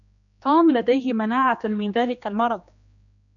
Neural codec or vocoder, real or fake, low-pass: codec, 16 kHz, 2 kbps, X-Codec, HuBERT features, trained on general audio; fake; 7.2 kHz